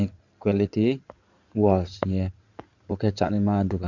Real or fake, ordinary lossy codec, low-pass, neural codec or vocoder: fake; Opus, 64 kbps; 7.2 kHz; codec, 16 kHz in and 24 kHz out, 2.2 kbps, FireRedTTS-2 codec